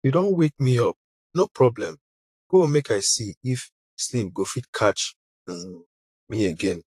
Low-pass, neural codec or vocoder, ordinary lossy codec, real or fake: 14.4 kHz; vocoder, 44.1 kHz, 128 mel bands, Pupu-Vocoder; AAC, 64 kbps; fake